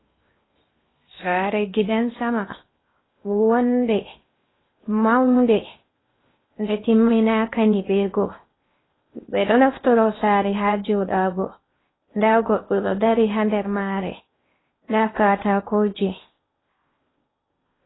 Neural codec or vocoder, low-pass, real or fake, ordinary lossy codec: codec, 16 kHz in and 24 kHz out, 0.6 kbps, FocalCodec, streaming, 2048 codes; 7.2 kHz; fake; AAC, 16 kbps